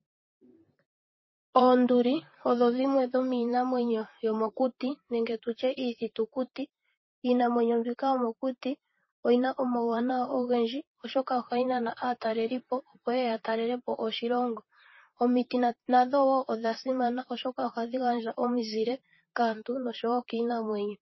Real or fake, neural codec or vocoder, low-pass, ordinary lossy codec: fake; vocoder, 44.1 kHz, 128 mel bands, Pupu-Vocoder; 7.2 kHz; MP3, 24 kbps